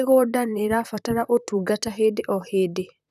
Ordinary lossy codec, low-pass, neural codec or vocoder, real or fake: none; 14.4 kHz; vocoder, 44.1 kHz, 128 mel bands, Pupu-Vocoder; fake